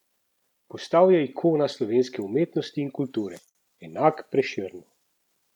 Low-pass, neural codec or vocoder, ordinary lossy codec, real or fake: 19.8 kHz; none; none; real